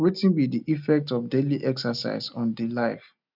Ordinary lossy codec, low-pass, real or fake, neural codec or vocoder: none; 5.4 kHz; real; none